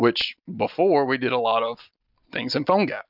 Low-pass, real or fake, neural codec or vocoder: 5.4 kHz; real; none